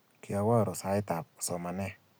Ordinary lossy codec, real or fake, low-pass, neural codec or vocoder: none; real; none; none